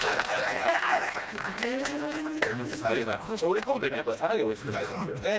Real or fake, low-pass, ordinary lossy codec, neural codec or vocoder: fake; none; none; codec, 16 kHz, 1 kbps, FreqCodec, smaller model